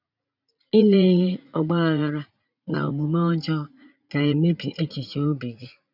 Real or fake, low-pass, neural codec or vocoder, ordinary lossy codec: fake; 5.4 kHz; vocoder, 44.1 kHz, 80 mel bands, Vocos; none